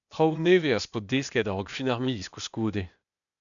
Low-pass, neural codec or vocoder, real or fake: 7.2 kHz; codec, 16 kHz, 0.8 kbps, ZipCodec; fake